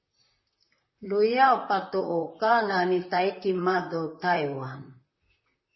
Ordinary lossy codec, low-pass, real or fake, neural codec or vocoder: MP3, 24 kbps; 7.2 kHz; fake; vocoder, 44.1 kHz, 128 mel bands, Pupu-Vocoder